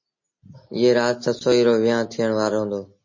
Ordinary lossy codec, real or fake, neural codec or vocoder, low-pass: MP3, 32 kbps; real; none; 7.2 kHz